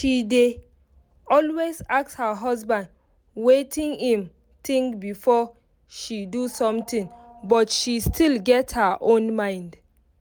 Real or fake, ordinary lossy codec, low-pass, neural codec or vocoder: real; none; none; none